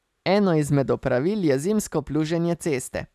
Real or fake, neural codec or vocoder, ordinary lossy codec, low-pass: real; none; none; 14.4 kHz